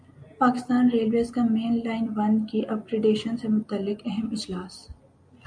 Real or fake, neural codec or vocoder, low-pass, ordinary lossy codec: real; none; 9.9 kHz; MP3, 64 kbps